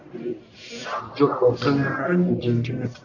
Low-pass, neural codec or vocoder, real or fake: 7.2 kHz; codec, 44.1 kHz, 1.7 kbps, Pupu-Codec; fake